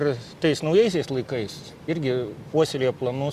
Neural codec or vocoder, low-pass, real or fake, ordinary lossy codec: none; 14.4 kHz; real; Opus, 64 kbps